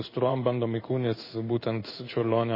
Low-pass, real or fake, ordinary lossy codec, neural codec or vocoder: 5.4 kHz; fake; MP3, 24 kbps; codec, 16 kHz in and 24 kHz out, 1 kbps, XY-Tokenizer